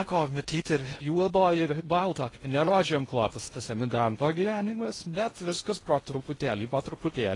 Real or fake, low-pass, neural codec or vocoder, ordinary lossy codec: fake; 10.8 kHz; codec, 16 kHz in and 24 kHz out, 0.6 kbps, FocalCodec, streaming, 2048 codes; AAC, 32 kbps